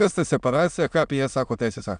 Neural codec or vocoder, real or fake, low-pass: autoencoder, 22.05 kHz, a latent of 192 numbers a frame, VITS, trained on many speakers; fake; 9.9 kHz